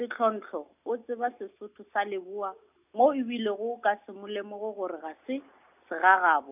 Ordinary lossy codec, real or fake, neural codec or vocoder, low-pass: none; real; none; 3.6 kHz